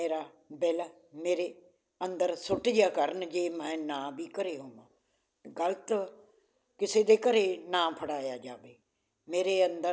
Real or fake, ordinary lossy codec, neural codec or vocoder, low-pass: real; none; none; none